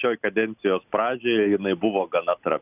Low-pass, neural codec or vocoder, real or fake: 3.6 kHz; none; real